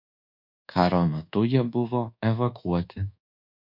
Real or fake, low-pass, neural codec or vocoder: fake; 5.4 kHz; codec, 24 kHz, 1.2 kbps, DualCodec